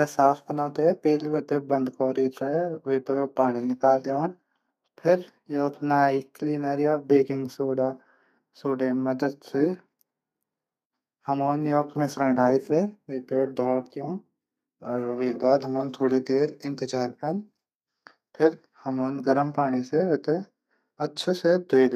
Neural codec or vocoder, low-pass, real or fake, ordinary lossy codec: codec, 32 kHz, 1.9 kbps, SNAC; 14.4 kHz; fake; none